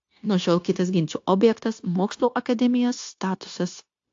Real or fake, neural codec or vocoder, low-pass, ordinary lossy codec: fake; codec, 16 kHz, 0.9 kbps, LongCat-Audio-Codec; 7.2 kHz; AAC, 48 kbps